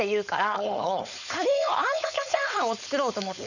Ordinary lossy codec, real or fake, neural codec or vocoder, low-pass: none; fake; codec, 16 kHz, 4.8 kbps, FACodec; 7.2 kHz